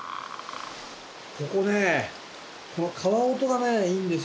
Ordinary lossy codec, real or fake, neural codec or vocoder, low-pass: none; real; none; none